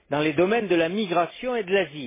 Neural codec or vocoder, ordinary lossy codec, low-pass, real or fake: none; MP3, 16 kbps; 3.6 kHz; real